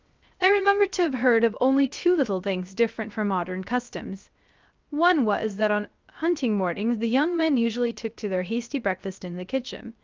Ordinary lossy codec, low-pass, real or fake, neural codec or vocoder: Opus, 32 kbps; 7.2 kHz; fake; codec, 16 kHz, 0.3 kbps, FocalCodec